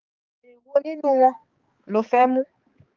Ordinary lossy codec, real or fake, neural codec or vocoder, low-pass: Opus, 32 kbps; fake; codec, 16 kHz, 4 kbps, X-Codec, HuBERT features, trained on balanced general audio; 7.2 kHz